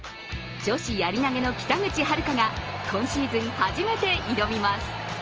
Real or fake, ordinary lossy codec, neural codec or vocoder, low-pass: real; Opus, 24 kbps; none; 7.2 kHz